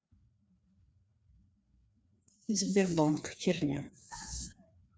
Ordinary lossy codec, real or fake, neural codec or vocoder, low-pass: none; fake; codec, 16 kHz, 2 kbps, FreqCodec, larger model; none